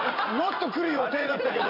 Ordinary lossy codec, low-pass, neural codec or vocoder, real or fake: none; 5.4 kHz; vocoder, 44.1 kHz, 80 mel bands, Vocos; fake